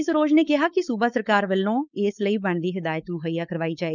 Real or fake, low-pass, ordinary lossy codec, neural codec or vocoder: fake; 7.2 kHz; none; codec, 16 kHz, 4.8 kbps, FACodec